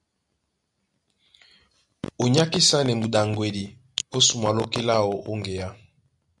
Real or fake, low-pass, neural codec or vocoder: real; 10.8 kHz; none